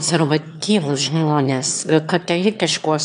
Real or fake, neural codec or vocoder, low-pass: fake; autoencoder, 22.05 kHz, a latent of 192 numbers a frame, VITS, trained on one speaker; 9.9 kHz